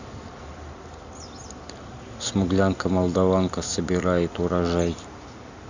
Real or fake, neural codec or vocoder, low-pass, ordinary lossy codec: real; none; 7.2 kHz; Opus, 64 kbps